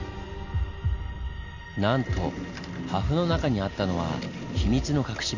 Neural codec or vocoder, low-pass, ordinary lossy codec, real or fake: none; 7.2 kHz; none; real